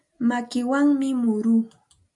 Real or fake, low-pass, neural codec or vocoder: real; 10.8 kHz; none